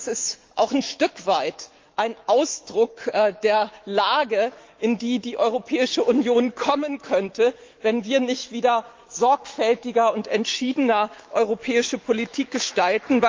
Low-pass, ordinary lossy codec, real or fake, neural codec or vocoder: 7.2 kHz; Opus, 32 kbps; fake; autoencoder, 48 kHz, 128 numbers a frame, DAC-VAE, trained on Japanese speech